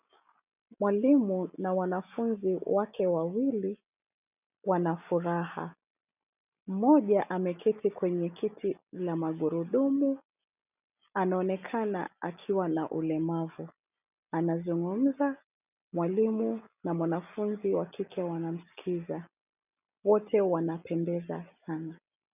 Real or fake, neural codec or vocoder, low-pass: real; none; 3.6 kHz